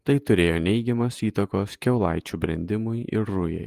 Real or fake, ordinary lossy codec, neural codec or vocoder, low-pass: fake; Opus, 24 kbps; vocoder, 48 kHz, 128 mel bands, Vocos; 14.4 kHz